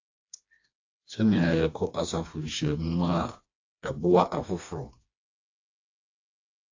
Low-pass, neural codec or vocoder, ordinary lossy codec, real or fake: 7.2 kHz; codec, 16 kHz, 2 kbps, FreqCodec, smaller model; AAC, 48 kbps; fake